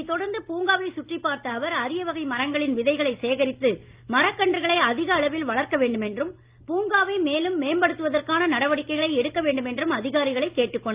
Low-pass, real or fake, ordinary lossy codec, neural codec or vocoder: 3.6 kHz; real; Opus, 24 kbps; none